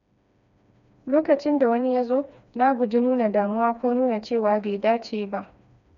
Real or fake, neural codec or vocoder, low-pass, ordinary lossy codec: fake; codec, 16 kHz, 2 kbps, FreqCodec, smaller model; 7.2 kHz; none